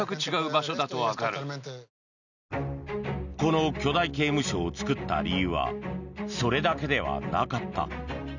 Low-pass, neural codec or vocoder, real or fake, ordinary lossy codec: 7.2 kHz; none; real; none